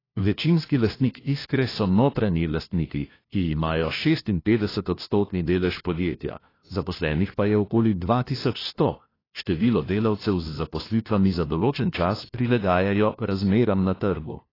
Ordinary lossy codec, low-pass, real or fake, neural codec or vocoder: AAC, 24 kbps; 5.4 kHz; fake; codec, 16 kHz, 1 kbps, FunCodec, trained on LibriTTS, 50 frames a second